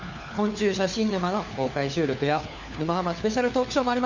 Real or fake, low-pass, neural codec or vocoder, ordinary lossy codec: fake; 7.2 kHz; codec, 16 kHz, 4 kbps, FunCodec, trained on LibriTTS, 50 frames a second; Opus, 64 kbps